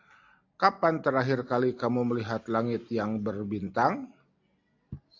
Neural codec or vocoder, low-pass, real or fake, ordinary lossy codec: none; 7.2 kHz; real; AAC, 48 kbps